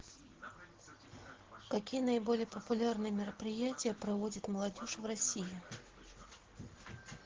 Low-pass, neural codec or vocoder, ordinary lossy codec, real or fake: 7.2 kHz; none; Opus, 16 kbps; real